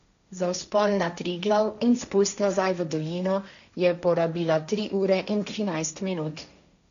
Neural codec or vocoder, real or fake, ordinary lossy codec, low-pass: codec, 16 kHz, 1.1 kbps, Voila-Tokenizer; fake; none; 7.2 kHz